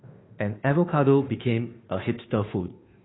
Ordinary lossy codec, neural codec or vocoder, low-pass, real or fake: AAC, 16 kbps; codec, 16 kHz, 2 kbps, FunCodec, trained on Chinese and English, 25 frames a second; 7.2 kHz; fake